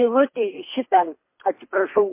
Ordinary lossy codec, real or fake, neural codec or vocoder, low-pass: MP3, 24 kbps; fake; codec, 24 kHz, 3 kbps, HILCodec; 3.6 kHz